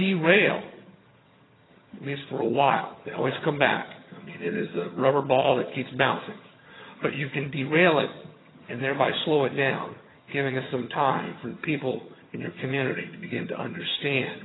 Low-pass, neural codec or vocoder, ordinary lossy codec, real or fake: 7.2 kHz; vocoder, 22.05 kHz, 80 mel bands, HiFi-GAN; AAC, 16 kbps; fake